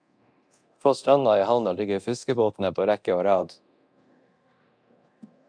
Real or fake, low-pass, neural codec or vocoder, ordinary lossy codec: fake; 9.9 kHz; codec, 24 kHz, 0.9 kbps, DualCodec; MP3, 96 kbps